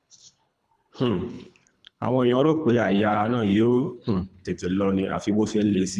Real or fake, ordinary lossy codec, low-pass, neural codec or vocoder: fake; none; none; codec, 24 kHz, 3 kbps, HILCodec